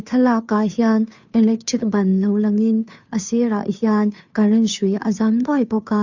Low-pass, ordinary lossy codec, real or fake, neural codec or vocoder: 7.2 kHz; none; fake; codec, 16 kHz, 2 kbps, FunCodec, trained on Chinese and English, 25 frames a second